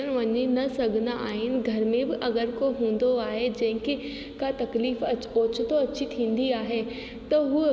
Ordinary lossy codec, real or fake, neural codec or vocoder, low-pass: none; real; none; none